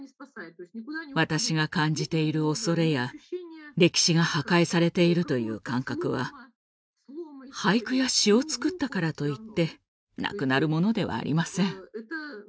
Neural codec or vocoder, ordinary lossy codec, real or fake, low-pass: none; none; real; none